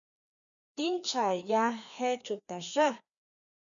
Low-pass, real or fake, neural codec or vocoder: 7.2 kHz; fake; codec, 16 kHz, 2 kbps, FreqCodec, larger model